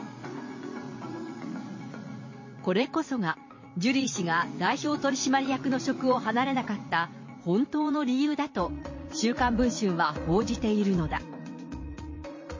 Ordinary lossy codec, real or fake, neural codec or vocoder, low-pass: MP3, 32 kbps; fake; vocoder, 44.1 kHz, 80 mel bands, Vocos; 7.2 kHz